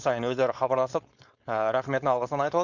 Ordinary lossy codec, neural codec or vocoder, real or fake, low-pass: none; codec, 16 kHz, 4.8 kbps, FACodec; fake; 7.2 kHz